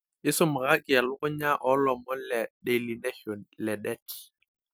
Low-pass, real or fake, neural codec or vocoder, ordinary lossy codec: none; real; none; none